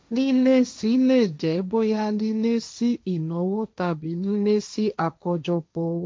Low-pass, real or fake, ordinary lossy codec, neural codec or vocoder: none; fake; none; codec, 16 kHz, 1.1 kbps, Voila-Tokenizer